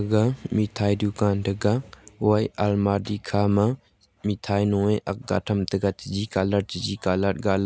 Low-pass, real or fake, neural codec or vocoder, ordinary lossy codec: none; real; none; none